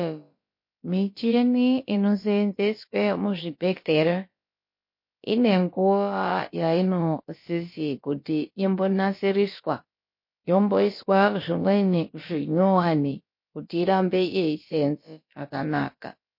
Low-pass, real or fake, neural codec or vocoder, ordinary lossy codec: 5.4 kHz; fake; codec, 16 kHz, about 1 kbps, DyCAST, with the encoder's durations; MP3, 32 kbps